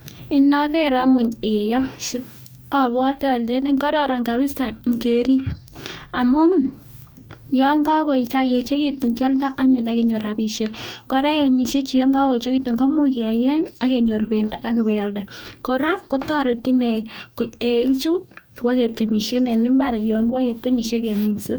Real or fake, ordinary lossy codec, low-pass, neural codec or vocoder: fake; none; none; codec, 44.1 kHz, 2.6 kbps, DAC